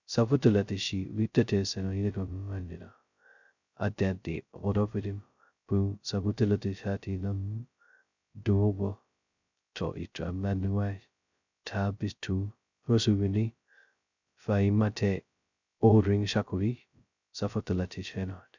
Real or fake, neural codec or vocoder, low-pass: fake; codec, 16 kHz, 0.2 kbps, FocalCodec; 7.2 kHz